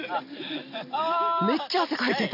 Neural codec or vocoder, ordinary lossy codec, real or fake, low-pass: none; AAC, 48 kbps; real; 5.4 kHz